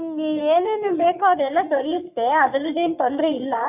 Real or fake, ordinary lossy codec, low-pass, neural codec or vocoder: fake; none; 3.6 kHz; codec, 44.1 kHz, 3.4 kbps, Pupu-Codec